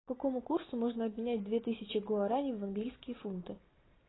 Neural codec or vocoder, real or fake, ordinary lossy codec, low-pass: none; real; AAC, 16 kbps; 7.2 kHz